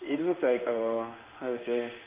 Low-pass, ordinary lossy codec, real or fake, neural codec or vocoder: 3.6 kHz; Opus, 24 kbps; fake; codec, 16 kHz in and 24 kHz out, 1 kbps, XY-Tokenizer